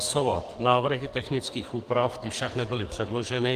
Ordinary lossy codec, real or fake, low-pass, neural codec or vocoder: Opus, 24 kbps; fake; 14.4 kHz; codec, 44.1 kHz, 2.6 kbps, SNAC